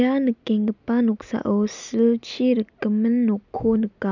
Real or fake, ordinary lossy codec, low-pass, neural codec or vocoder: real; none; 7.2 kHz; none